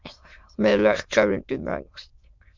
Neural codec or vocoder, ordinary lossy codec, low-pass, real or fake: autoencoder, 22.05 kHz, a latent of 192 numbers a frame, VITS, trained on many speakers; MP3, 64 kbps; 7.2 kHz; fake